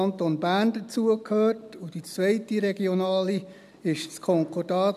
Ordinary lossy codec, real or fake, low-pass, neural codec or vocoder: none; real; 14.4 kHz; none